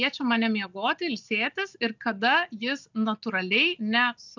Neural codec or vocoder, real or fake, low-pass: none; real; 7.2 kHz